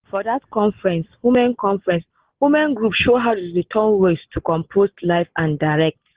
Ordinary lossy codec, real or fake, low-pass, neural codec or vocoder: Opus, 16 kbps; real; 3.6 kHz; none